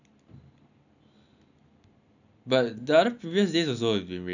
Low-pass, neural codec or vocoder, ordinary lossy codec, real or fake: 7.2 kHz; none; none; real